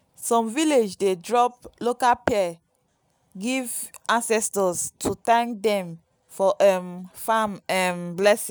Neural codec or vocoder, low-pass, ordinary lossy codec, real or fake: none; none; none; real